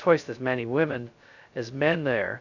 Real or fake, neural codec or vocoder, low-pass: fake; codec, 16 kHz, 0.2 kbps, FocalCodec; 7.2 kHz